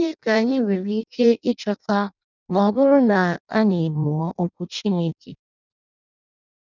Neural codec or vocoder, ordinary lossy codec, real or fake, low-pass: codec, 16 kHz in and 24 kHz out, 0.6 kbps, FireRedTTS-2 codec; none; fake; 7.2 kHz